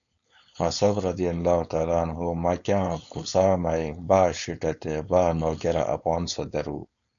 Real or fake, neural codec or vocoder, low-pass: fake; codec, 16 kHz, 4.8 kbps, FACodec; 7.2 kHz